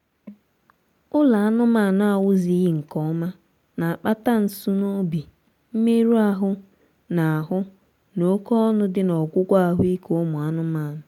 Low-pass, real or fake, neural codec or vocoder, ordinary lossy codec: 19.8 kHz; real; none; MP3, 96 kbps